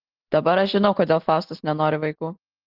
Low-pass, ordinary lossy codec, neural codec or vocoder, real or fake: 5.4 kHz; Opus, 16 kbps; none; real